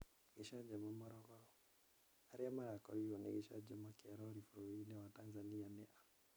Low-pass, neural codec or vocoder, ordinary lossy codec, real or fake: none; none; none; real